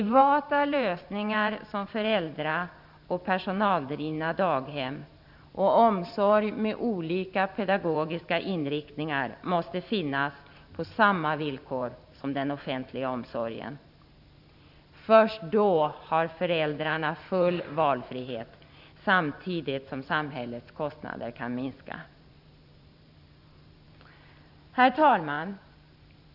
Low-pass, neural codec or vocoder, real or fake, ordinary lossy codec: 5.4 kHz; vocoder, 44.1 kHz, 128 mel bands every 512 samples, BigVGAN v2; fake; none